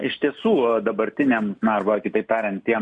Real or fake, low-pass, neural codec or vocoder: real; 9.9 kHz; none